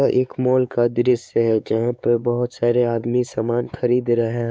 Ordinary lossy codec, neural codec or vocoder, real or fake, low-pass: none; codec, 16 kHz, 4 kbps, X-Codec, WavLM features, trained on Multilingual LibriSpeech; fake; none